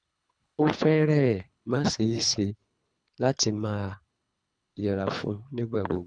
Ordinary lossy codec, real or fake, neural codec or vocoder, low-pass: none; fake; codec, 24 kHz, 3 kbps, HILCodec; 9.9 kHz